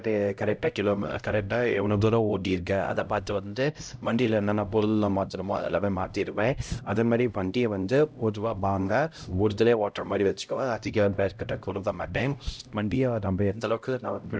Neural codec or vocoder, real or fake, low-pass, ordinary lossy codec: codec, 16 kHz, 0.5 kbps, X-Codec, HuBERT features, trained on LibriSpeech; fake; none; none